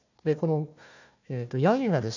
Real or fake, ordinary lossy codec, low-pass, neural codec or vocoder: fake; none; 7.2 kHz; codec, 16 kHz, 1 kbps, FunCodec, trained on Chinese and English, 50 frames a second